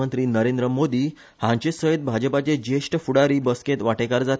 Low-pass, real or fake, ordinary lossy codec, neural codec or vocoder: none; real; none; none